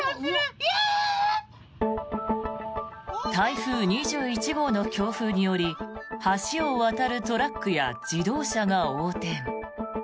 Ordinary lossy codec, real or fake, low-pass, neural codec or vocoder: none; real; none; none